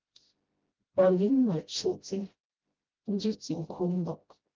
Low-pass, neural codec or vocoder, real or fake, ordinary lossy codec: 7.2 kHz; codec, 16 kHz, 0.5 kbps, FreqCodec, smaller model; fake; Opus, 24 kbps